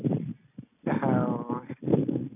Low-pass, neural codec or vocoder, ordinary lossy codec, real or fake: 3.6 kHz; none; none; real